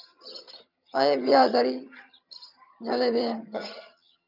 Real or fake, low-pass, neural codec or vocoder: fake; 5.4 kHz; vocoder, 22.05 kHz, 80 mel bands, HiFi-GAN